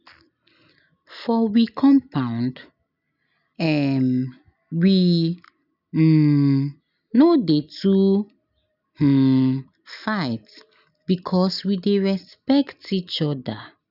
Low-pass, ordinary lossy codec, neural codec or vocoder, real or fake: 5.4 kHz; none; none; real